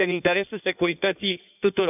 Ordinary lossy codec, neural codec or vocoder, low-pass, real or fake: none; codec, 16 kHz in and 24 kHz out, 1.1 kbps, FireRedTTS-2 codec; 3.6 kHz; fake